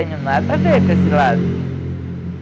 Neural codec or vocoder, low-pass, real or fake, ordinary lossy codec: none; none; real; none